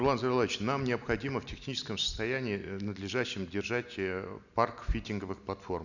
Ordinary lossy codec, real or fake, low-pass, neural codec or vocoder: none; real; 7.2 kHz; none